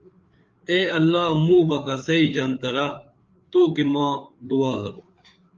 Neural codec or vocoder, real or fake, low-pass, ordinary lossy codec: codec, 16 kHz, 4 kbps, FreqCodec, larger model; fake; 7.2 kHz; Opus, 24 kbps